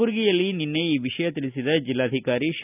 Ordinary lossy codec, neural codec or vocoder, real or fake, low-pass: none; none; real; 3.6 kHz